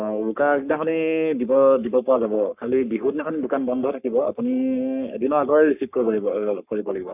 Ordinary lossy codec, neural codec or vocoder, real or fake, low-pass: none; codec, 44.1 kHz, 3.4 kbps, Pupu-Codec; fake; 3.6 kHz